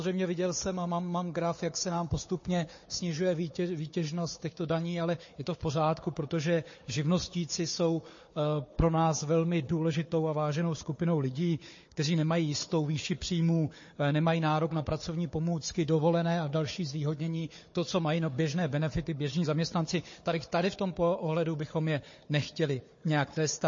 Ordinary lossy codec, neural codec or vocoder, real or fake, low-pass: MP3, 32 kbps; codec, 16 kHz, 4 kbps, FunCodec, trained on Chinese and English, 50 frames a second; fake; 7.2 kHz